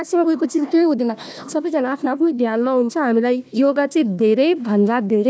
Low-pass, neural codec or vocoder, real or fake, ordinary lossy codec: none; codec, 16 kHz, 1 kbps, FunCodec, trained on Chinese and English, 50 frames a second; fake; none